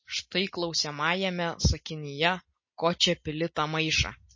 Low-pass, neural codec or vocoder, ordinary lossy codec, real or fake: 7.2 kHz; none; MP3, 32 kbps; real